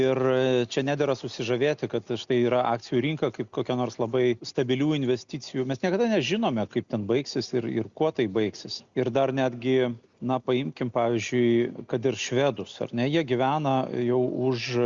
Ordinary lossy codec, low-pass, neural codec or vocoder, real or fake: Opus, 64 kbps; 7.2 kHz; none; real